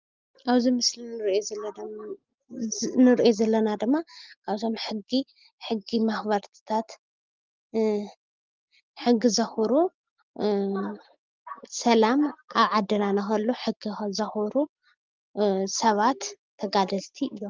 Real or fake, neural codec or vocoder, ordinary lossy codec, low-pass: real; none; Opus, 32 kbps; 7.2 kHz